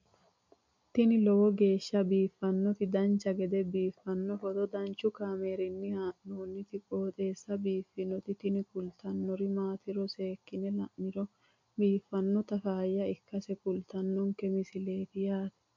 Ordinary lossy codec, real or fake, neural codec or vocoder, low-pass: MP3, 48 kbps; real; none; 7.2 kHz